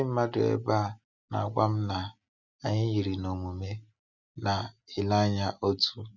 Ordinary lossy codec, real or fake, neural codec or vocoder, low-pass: none; real; none; 7.2 kHz